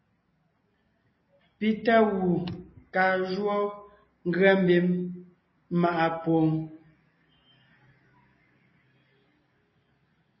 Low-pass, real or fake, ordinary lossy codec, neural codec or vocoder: 7.2 kHz; real; MP3, 24 kbps; none